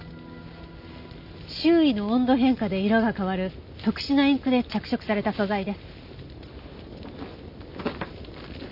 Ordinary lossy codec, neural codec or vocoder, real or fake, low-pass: none; none; real; 5.4 kHz